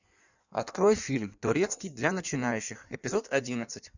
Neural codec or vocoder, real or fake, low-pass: codec, 16 kHz in and 24 kHz out, 1.1 kbps, FireRedTTS-2 codec; fake; 7.2 kHz